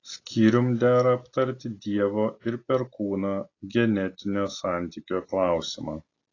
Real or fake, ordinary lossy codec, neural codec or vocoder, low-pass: real; AAC, 32 kbps; none; 7.2 kHz